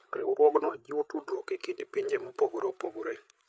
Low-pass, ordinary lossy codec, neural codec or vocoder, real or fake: none; none; codec, 16 kHz, 8 kbps, FreqCodec, larger model; fake